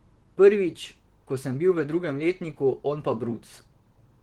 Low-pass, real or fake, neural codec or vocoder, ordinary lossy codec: 19.8 kHz; fake; vocoder, 44.1 kHz, 128 mel bands, Pupu-Vocoder; Opus, 16 kbps